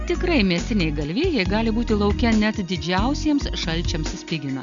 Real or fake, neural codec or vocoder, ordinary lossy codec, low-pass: real; none; Opus, 64 kbps; 7.2 kHz